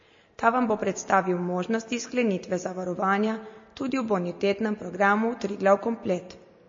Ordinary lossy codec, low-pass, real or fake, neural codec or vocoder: MP3, 32 kbps; 7.2 kHz; real; none